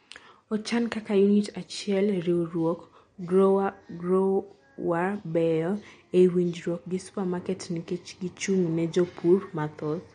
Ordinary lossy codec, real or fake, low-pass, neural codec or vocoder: MP3, 48 kbps; real; 9.9 kHz; none